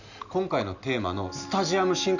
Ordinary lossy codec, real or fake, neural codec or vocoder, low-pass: none; real; none; 7.2 kHz